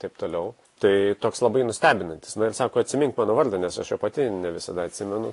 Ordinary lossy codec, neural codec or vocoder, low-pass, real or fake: AAC, 48 kbps; none; 10.8 kHz; real